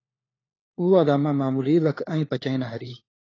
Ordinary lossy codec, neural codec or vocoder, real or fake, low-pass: AAC, 32 kbps; codec, 16 kHz, 4 kbps, FunCodec, trained on LibriTTS, 50 frames a second; fake; 7.2 kHz